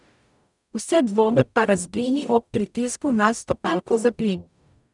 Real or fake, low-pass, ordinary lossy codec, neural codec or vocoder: fake; 10.8 kHz; none; codec, 44.1 kHz, 0.9 kbps, DAC